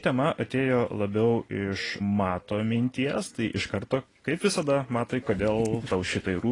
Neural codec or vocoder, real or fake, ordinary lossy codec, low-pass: none; real; AAC, 32 kbps; 10.8 kHz